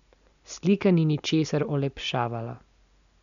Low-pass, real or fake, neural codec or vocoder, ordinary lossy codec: 7.2 kHz; real; none; none